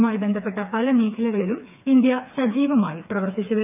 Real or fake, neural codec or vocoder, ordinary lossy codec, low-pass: fake; codec, 16 kHz, 4 kbps, FreqCodec, smaller model; none; 3.6 kHz